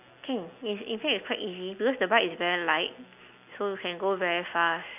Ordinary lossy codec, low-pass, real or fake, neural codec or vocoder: none; 3.6 kHz; real; none